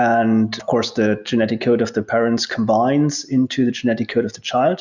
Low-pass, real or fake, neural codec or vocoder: 7.2 kHz; real; none